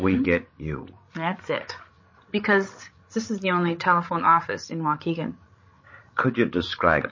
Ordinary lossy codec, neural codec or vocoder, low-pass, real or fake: MP3, 32 kbps; codec, 16 kHz, 16 kbps, FreqCodec, larger model; 7.2 kHz; fake